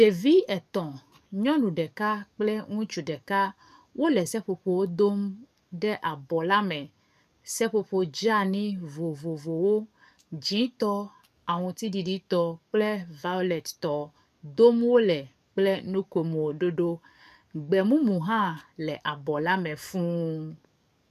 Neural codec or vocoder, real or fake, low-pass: codec, 44.1 kHz, 7.8 kbps, DAC; fake; 14.4 kHz